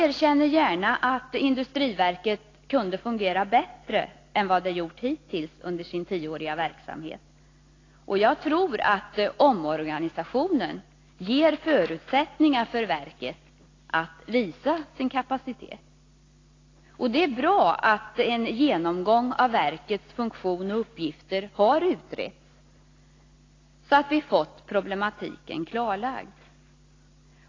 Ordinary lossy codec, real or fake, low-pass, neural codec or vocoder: AAC, 32 kbps; real; 7.2 kHz; none